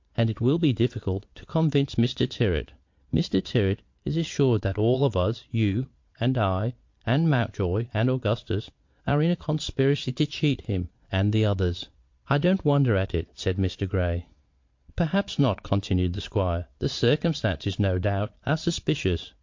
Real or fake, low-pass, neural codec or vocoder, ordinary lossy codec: fake; 7.2 kHz; vocoder, 22.05 kHz, 80 mel bands, Vocos; MP3, 48 kbps